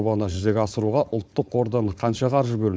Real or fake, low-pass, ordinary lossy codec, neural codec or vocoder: fake; none; none; codec, 16 kHz, 4.8 kbps, FACodec